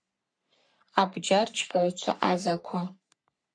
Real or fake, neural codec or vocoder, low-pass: fake; codec, 44.1 kHz, 3.4 kbps, Pupu-Codec; 9.9 kHz